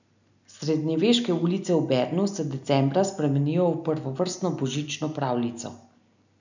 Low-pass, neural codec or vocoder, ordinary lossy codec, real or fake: 7.2 kHz; none; none; real